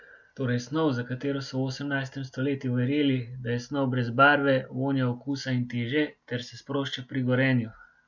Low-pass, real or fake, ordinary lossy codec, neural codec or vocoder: 7.2 kHz; real; none; none